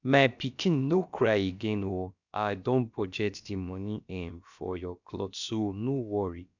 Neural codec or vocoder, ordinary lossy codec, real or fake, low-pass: codec, 16 kHz, about 1 kbps, DyCAST, with the encoder's durations; none; fake; 7.2 kHz